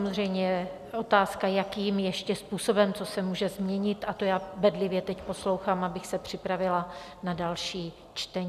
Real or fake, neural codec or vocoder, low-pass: real; none; 14.4 kHz